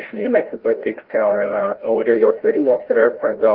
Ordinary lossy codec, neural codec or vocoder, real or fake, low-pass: Opus, 16 kbps; codec, 16 kHz, 0.5 kbps, FreqCodec, larger model; fake; 5.4 kHz